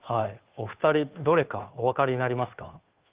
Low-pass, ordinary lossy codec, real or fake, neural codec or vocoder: 3.6 kHz; Opus, 32 kbps; fake; codec, 16 kHz, 2 kbps, FunCodec, trained on Chinese and English, 25 frames a second